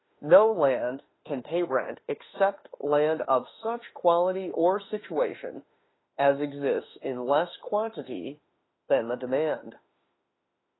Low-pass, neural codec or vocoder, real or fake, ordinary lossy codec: 7.2 kHz; autoencoder, 48 kHz, 32 numbers a frame, DAC-VAE, trained on Japanese speech; fake; AAC, 16 kbps